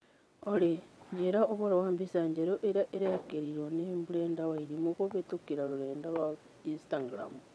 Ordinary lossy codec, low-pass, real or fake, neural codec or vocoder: none; none; fake; vocoder, 22.05 kHz, 80 mel bands, WaveNeXt